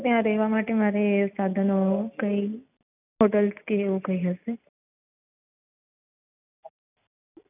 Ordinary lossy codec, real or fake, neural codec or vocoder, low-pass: none; real; none; 3.6 kHz